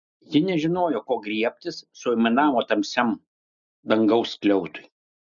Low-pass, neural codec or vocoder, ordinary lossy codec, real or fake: 7.2 kHz; none; MP3, 96 kbps; real